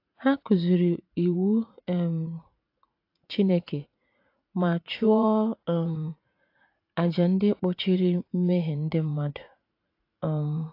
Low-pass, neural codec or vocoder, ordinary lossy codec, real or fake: 5.4 kHz; vocoder, 44.1 kHz, 80 mel bands, Vocos; AAC, 32 kbps; fake